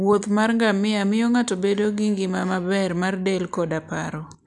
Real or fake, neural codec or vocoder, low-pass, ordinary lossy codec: real; none; 10.8 kHz; none